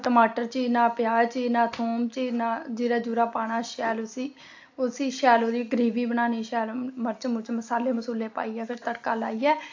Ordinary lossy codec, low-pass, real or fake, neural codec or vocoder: AAC, 48 kbps; 7.2 kHz; real; none